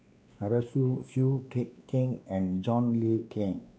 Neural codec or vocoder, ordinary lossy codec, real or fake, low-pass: codec, 16 kHz, 2 kbps, X-Codec, WavLM features, trained on Multilingual LibriSpeech; none; fake; none